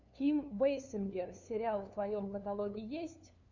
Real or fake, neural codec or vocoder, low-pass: fake; codec, 16 kHz, 2 kbps, FunCodec, trained on LibriTTS, 25 frames a second; 7.2 kHz